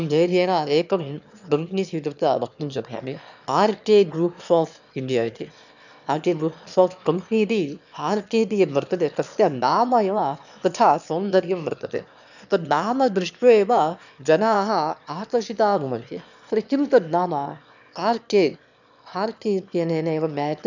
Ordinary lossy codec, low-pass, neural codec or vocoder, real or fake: none; 7.2 kHz; autoencoder, 22.05 kHz, a latent of 192 numbers a frame, VITS, trained on one speaker; fake